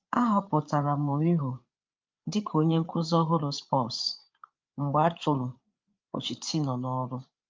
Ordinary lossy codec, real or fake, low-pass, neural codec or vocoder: Opus, 24 kbps; fake; 7.2 kHz; codec, 16 kHz, 8 kbps, FreqCodec, larger model